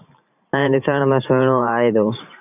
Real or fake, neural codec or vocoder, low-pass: fake; vocoder, 44.1 kHz, 128 mel bands every 512 samples, BigVGAN v2; 3.6 kHz